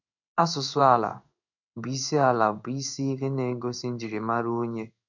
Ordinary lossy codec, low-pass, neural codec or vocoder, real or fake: none; 7.2 kHz; codec, 16 kHz in and 24 kHz out, 1 kbps, XY-Tokenizer; fake